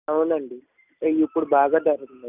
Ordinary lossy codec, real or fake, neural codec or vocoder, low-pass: none; real; none; 3.6 kHz